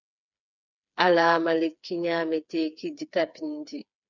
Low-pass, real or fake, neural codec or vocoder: 7.2 kHz; fake; codec, 16 kHz, 4 kbps, FreqCodec, smaller model